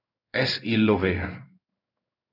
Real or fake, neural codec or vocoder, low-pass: fake; codec, 16 kHz in and 24 kHz out, 1 kbps, XY-Tokenizer; 5.4 kHz